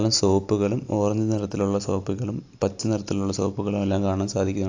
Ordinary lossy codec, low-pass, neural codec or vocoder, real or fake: AAC, 48 kbps; 7.2 kHz; none; real